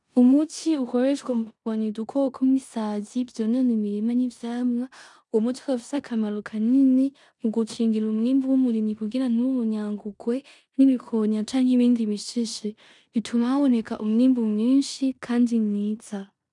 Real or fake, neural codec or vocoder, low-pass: fake; codec, 16 kHz in and 24 kHz out, 0.9 kbps, LongCat-Audio-Codec, four codebook decoder; 10.8 kHz